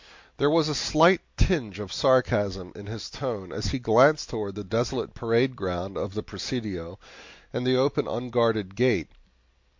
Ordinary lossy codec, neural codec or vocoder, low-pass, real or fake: MP3, 48 kbps; none; 7.2 kHz; real